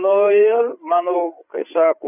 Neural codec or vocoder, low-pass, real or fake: codec, 16 kHz, 8 kbps, FreqCodec, larger model; 3.6 kHz; fake